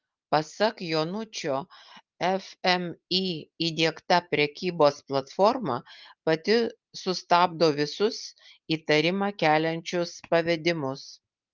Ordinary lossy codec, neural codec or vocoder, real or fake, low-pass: Opus, 32 kbps; none; real; 7.2 kHz